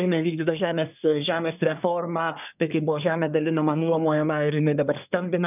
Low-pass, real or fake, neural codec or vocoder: 3.6 kHz; fake; codec, 24 kHz, 1 kbps, SNAC